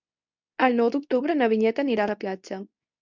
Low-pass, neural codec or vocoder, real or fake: 7.2 kHz; codec, 24 kHz, 0.9 kbps, WavTokenizer, medium speech release version 2; fake